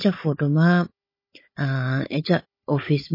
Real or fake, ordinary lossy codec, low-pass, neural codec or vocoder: fake; MP3, 24 kbps; 5.4 kHz; codec, 16 kHz, 16 kbps, FunCodec, trained on Chinese and English, 50 frames a second